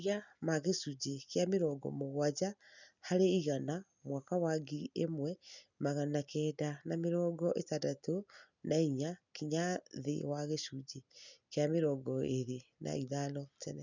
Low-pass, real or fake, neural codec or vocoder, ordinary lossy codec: 7.2 kHz; real; none; none